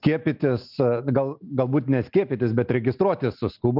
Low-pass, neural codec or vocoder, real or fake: 5.4 kHz; none; real